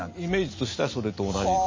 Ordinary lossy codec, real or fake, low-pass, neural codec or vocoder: MP3, 32 kbps; real; 7.2 kHz; none